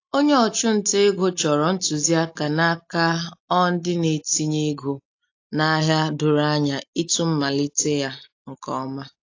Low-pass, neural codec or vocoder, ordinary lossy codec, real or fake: 7.2 kHz; none; AAC, 48 kbps; real